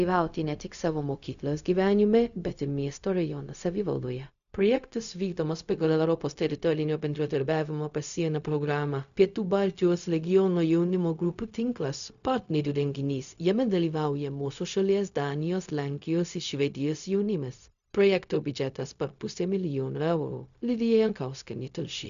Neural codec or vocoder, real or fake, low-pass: codec, 16 kHz, 0.4 kbps, LongCat-Audio-Codec; fake; 7.2 kHz